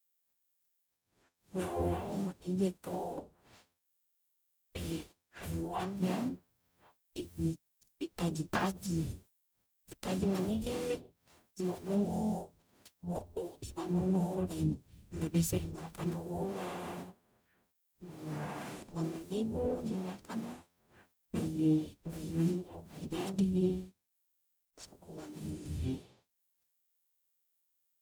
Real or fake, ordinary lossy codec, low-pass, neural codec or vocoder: fake; none; none; codec, 44.1 kHz, 0.9 kbps, DAC